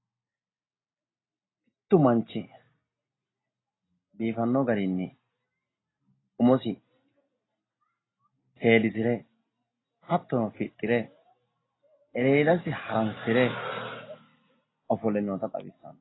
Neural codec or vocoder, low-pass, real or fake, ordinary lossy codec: none; 7.2 kHz; real; AAC, 16 kbps